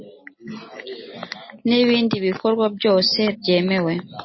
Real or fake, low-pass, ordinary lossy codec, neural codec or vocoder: real; 7.2 kHz; MP3, 24 kbps; none